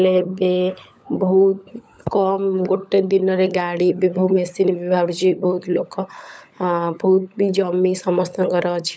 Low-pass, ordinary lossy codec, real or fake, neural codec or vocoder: none; none; fake; codec, 16 kHz, 16 kbps, FunCodec, trained on LibriTTS, 50 frames a second